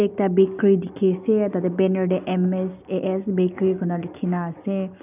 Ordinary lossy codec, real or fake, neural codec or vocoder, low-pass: none; real; none; 3.6 kHz